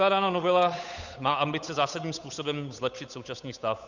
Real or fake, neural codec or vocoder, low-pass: fake; codec, 16 kHz, 8 kbps, FunCodec, trained on Chinese and English, 25 frames a second; 7.2 kHz